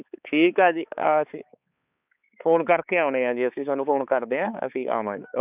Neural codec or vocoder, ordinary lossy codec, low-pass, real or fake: codec, 16 kHz, 4 kbps, X-Codec, HuBERT features, trained on balanced general audio; none; 3.6 kHz; fake